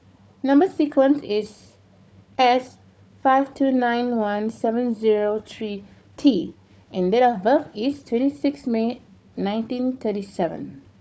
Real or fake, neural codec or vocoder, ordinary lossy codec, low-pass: fake; codec, 16 kHz, 4 kbps, FunCodec, trained on Chinese and English, 50 frames a second; none; none